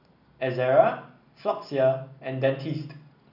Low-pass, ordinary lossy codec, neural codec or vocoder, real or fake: 5.4 kHz; none; none; real